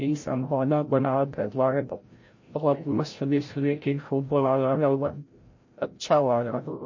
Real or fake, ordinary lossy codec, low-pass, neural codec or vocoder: fake; MP3, 32 kbps; 7.2 kHz; codec, 16 kHz, 0.5 kbps, FreqCodec, larger model